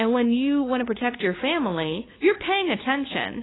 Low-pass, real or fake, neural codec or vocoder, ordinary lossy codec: 7.2 kHz; fake; codec, 16 kHz, 2 kbps, FunCodec, trained on LibriTTS, 25 frames a second; AAC, 16 kbps